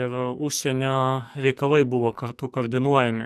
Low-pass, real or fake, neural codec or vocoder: 14.4 kHz; fake; codec, 44.1 kHz, 2.6 kbps, SNAC